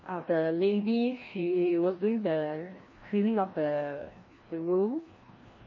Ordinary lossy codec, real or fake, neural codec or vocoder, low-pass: MP3, 32 kbps; fake; codec, 16 kHz, 1 kbps, FreqCodec, larger model; 7.2 kHz